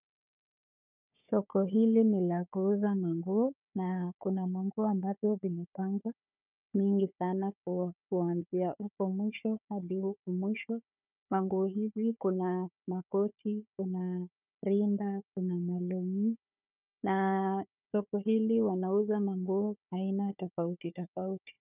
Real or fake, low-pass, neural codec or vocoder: fake; 3.6 kHz; codec, 16 kHz, 4 kbps, FunCodec, trained on Chinese and English, 50 frames a second